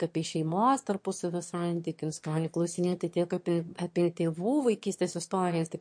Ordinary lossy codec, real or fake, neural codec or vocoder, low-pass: MP3, 48 kbps; fake; autoencoder, 22.05 kHz, a latent of 192 numbers a frame, VITS, trained on one speaker; 9.9 kHz